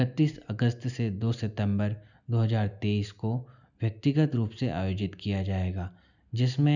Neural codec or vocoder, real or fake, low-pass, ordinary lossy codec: none; real; 7.2 kHz; none